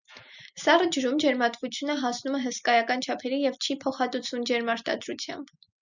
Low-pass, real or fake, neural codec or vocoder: 7.2 kHz; real; none